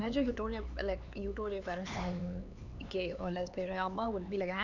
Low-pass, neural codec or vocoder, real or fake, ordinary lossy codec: 7.2 kHz; codec, 16 kHz, 4 kbps, X-Codec, HuBERT features, trained on LibriSpeech; fake; none